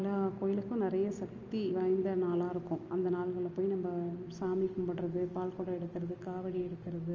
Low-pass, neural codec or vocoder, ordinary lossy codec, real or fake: 7.2 kHz; none; Opus, 24 kbps; real